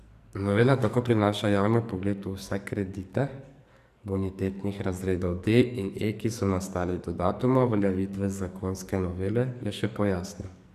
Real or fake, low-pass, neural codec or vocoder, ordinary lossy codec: fake; 14.4 kHz; codec, 44.1 kHz, 2.6 kbps, SNAC; none